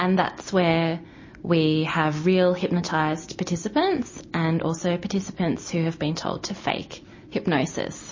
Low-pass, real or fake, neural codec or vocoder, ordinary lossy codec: 7.2 kHz; real; none; MP3, 32 kbps